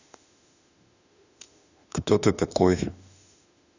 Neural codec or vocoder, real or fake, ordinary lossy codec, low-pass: autoencoder, 48 kHz, 32 numbers a frame, DAC-VAE, trained on Japanese speech; fake; none; 7.2 kHz